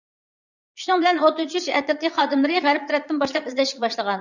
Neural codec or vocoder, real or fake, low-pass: vocoder, 22.05 kHz, 80 mel bands, Vocos; fake; 7.2 kHz